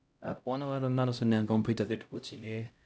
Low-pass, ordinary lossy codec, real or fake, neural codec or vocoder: none; none; fake; codec, 16 kHz, 0.5 kbps, X-Codec, HuBERT features, trained on LibriSpeech